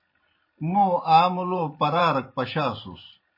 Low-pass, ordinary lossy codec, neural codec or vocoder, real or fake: 5.4 kHz; MP3, 24 kbps; vocoder, 44.1 kHz, 128 mel bands every 512 samples, BigVGAN v2; fake